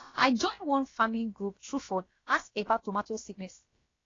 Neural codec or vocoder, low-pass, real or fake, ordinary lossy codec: codec, 16 kHz, about 1 kbps, DyCAST, with the encoder's durations; 7.2 kHz; fake; AAC, 32 kbps